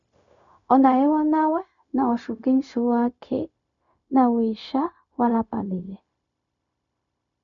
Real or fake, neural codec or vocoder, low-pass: fake; codec, 16 kHz, 0.4 kbps, LongCat-Audio-Codec; 7.2 kHz